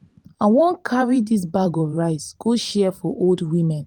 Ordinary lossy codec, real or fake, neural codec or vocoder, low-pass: Opus, 24 kbps; fake; vocoder, 44.1 kHz, 128 mel bands every 512 samples, BigVGAN v2; 19.8 kHz